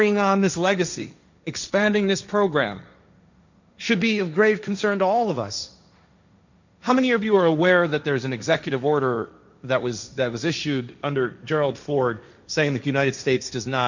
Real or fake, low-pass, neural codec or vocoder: fake; 7.2 kHz; codec, 16 kHz, 1.1 kbps, Voila-Tokenizer